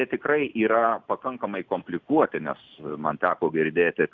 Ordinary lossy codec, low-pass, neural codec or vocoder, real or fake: Opus, 64 kbps; 7.2 kHz; none; real